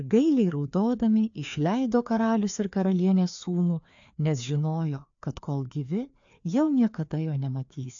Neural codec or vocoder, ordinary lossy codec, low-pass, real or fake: codec, 16 kHz, 2 kbps, FreqCodec, larger model; AAC, 64 kbps; 7.2 kHz; fake